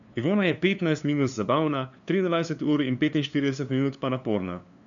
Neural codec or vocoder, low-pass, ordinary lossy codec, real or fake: codec, 16 kHz, 2 kbps, FunCodec, trained on LibriTTS, 25 frames a second; 7.2 kHz; none; fake